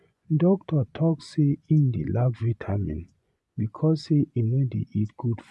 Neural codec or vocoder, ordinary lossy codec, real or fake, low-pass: vocoder, 24 kHz, 100 mel bands, Vocos; none; fake; none